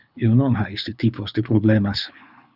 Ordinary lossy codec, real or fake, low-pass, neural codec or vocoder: Opus, 64 kbps; fake; 5.4 kHz; codec, 24 kHz, 6 kbps, HILCodec